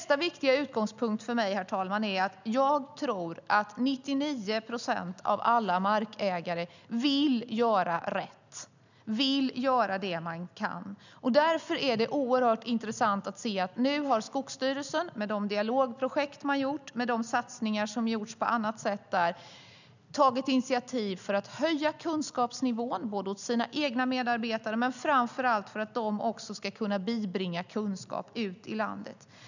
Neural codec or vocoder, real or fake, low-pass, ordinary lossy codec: none; real; 7.2 kHz; none